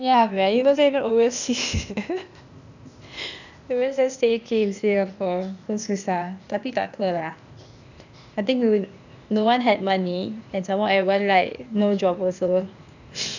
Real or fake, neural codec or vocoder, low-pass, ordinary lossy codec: fake; codec, 16 kHz, 0.8 kbps, ZipCodec; 7.2 kHz; none